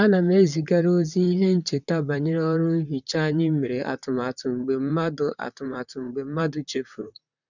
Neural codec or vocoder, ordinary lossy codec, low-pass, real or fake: vocoder, 44.1 kHz, 128 mel bands, Pupu-Vocoder; none; 7.2 kHz; fake